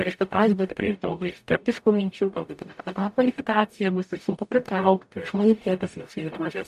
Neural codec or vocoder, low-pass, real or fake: codec, 44.1 kHz, 0.9 kbps, DAC; 14.4 kHz; fake